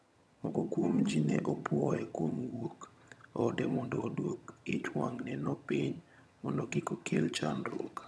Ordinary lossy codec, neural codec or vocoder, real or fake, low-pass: none; vocoder, 22.05 kHz, 80 mel bands, HiFi-GAN; fake; none